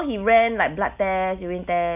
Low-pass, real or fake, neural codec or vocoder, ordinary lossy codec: 3.6 kHz; real; none; none